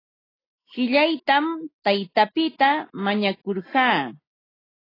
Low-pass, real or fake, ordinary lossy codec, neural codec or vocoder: 5.4 kHz; real; AAC, 24 kbps; none